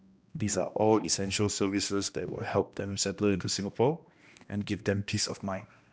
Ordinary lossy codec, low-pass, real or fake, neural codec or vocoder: none; none; fake; codec, 16 kHz, 1 kbps, X-Codec, HuBERT features, trained on balanced general audio